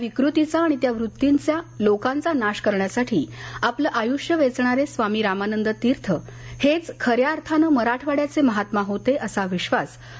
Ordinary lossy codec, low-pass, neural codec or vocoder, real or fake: none; none; none; real